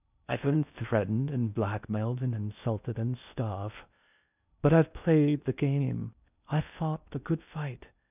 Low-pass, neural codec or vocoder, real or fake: 3.6 kHz; codec, 16 kHz in and 24 kHz out, 0.6 kbps, FocalCodec, streaming, 4096 codes; fake